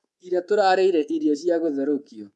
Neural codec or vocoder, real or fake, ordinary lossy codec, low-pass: codec, 24 kHz, 3.1 kbps, DualCodec; fake; none; none